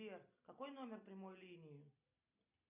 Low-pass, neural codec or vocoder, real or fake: 3.6 kHz; none; real